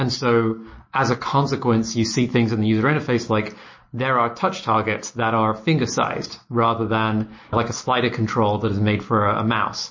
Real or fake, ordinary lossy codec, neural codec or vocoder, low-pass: real; MP3, 32 kbps; none; 7.2 kHz